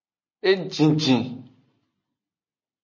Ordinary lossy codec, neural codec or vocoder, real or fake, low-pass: MP3, 32 kbps; none; real; 7.2 kHz